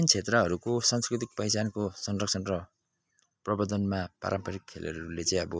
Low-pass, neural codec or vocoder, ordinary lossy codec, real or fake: none; none; none; real